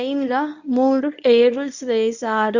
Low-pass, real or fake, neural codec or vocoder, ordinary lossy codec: 7.2 kHz; fake; codec, 24 kHz, 0.9 kbps, WavTokenizer, medium speech release version 1; none